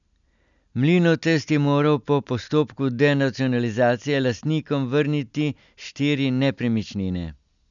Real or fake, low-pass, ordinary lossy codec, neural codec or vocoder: real; 7.2 kHz; none; none